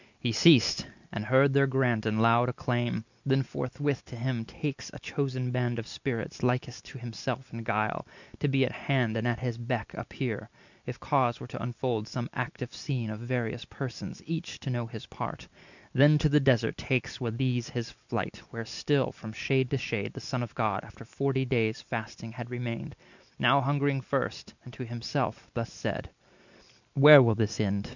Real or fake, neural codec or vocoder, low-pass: real; none; 7.2 kHz